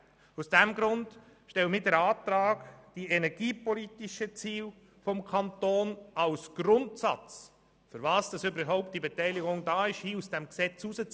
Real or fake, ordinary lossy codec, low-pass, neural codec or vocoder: real; none; none; none